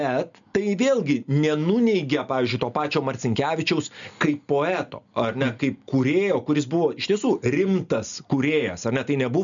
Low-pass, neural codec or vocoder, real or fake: 7.2 kHz; none; real